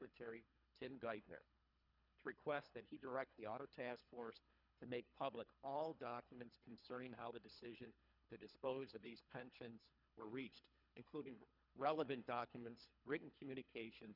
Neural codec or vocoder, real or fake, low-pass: codec, 24 kHz, 3 kbps, HILCodec; fake; 5.4 kHz